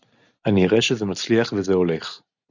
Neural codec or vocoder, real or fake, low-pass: none; real; 7.2 kHz